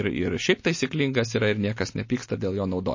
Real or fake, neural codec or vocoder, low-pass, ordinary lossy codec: real; none; 7.2 kHz; MP3, 32 kbps